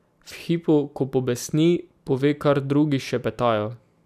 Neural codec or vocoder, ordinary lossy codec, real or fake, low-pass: none; none; real; 14.4 kHz